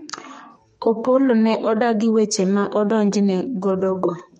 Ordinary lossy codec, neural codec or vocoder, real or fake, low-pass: MP3, 48 kbps; codec, 32 kHz, 1.9 kbps, SNAC; fake; 14.4 kHz